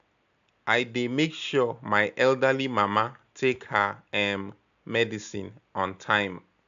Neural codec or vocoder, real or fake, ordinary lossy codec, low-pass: none; real; AAC, 96 kbps; 7.2 kHz